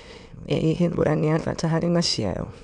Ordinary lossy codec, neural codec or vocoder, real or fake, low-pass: none; autoencoder, 22.05 kHz, a latent of 192 numbers a frame, VITS, trained on many speakers; fake; 9.9 kHz